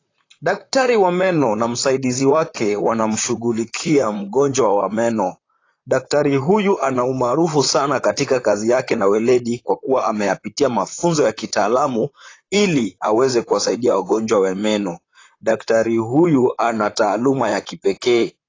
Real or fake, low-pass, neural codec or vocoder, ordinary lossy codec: fake; 7.2 kHz; vocoder, 44.1 kHz, 128 mel bands, Pupu-Vocoder; AAC, 32 kbps